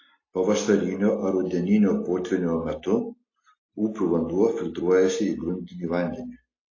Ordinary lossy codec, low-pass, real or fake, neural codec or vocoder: AAC, 32 kbps; 7.2 kHz; real; none